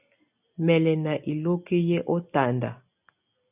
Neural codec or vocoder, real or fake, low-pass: none; real; 3.6 kHz